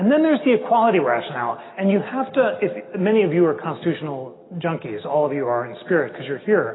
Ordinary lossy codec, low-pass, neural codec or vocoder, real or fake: AAC, 16 kbps; 7.2 kHz; none; real